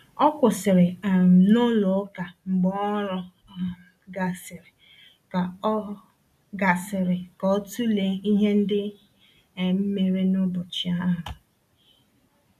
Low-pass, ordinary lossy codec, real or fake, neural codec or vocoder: 14.4 kHz; MP3, 96 kbps; real; none